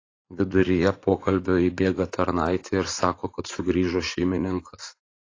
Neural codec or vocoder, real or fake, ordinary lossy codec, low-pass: vocoder, 44.1 kHz, 80 mel bands, Vocos; fake; AAC, 32 kbps; 7.2 kHz